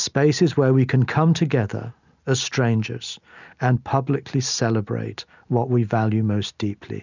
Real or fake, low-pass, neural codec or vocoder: real; 7.2 kHz; none